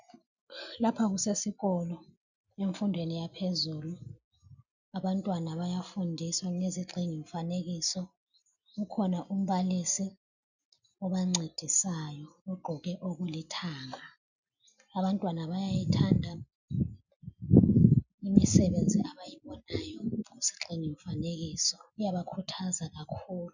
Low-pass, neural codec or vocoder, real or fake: 7.2 kHz; none; real